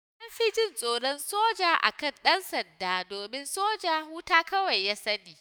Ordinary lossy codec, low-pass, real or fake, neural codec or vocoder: none; none; fake; autoencoder, 48 kHz, 128 numbers a frame, DAC-VAE, trained on Japanese speech